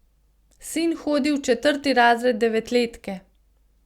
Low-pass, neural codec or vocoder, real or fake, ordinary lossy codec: 19.8 kHz; none; real; Opus, 64 kbps